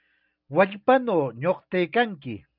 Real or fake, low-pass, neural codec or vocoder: real; 5.4 kHz; none